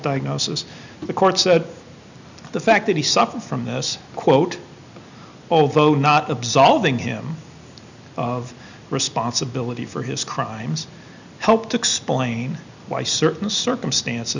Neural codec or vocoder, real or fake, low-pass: none; real; 7.2 kHz